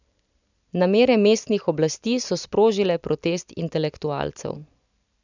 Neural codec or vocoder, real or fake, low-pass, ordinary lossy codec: none; real; 7.2 kHz; none